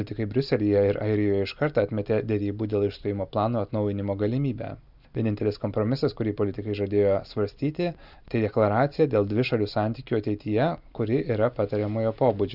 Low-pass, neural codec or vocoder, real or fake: 5.4 kHz; none; real